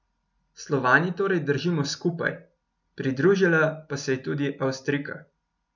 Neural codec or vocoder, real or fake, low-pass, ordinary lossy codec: none; real; 7.2 kHz; none